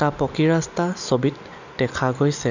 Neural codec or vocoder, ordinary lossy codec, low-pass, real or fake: none; none; 7.2 kHz; real